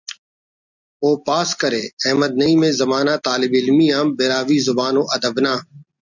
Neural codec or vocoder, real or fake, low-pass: none; real; 7.2 kHz